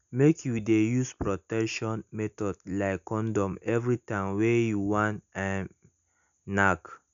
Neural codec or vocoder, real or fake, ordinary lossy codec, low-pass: none; real; MP3, 96 kbps; 7.2 kHz